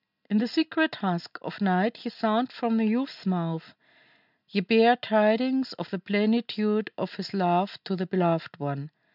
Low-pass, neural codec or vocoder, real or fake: 5.4 kHz; none; real